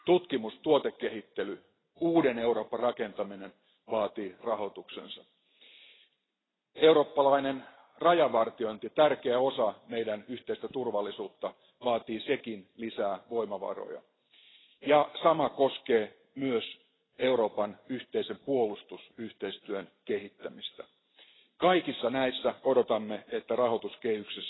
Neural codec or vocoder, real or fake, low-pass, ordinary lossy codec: none; real; 7.2 kHz; AAC, 16 kbps